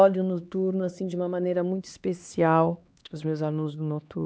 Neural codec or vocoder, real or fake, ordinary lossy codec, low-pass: codec, 16 kHz, 2 kbps, X-Codec, HuBERT features, trained on LibriSpeech; fake; none; none